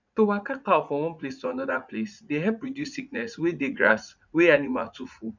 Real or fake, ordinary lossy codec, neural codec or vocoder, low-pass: fake; none; vocoder, 44.1 kHz, 80 mel bands, Vocos; 7.2 kHz